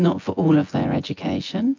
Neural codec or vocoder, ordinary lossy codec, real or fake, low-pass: vocoder, 24 kHz, 100 mel bands, Vocos; MP3, 64 kbps; fake; 7.2 kHz